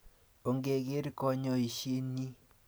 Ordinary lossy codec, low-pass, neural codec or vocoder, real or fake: none; none; none; real